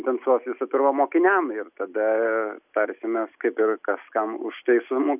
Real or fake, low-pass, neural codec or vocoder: real; 3.6 kHz; none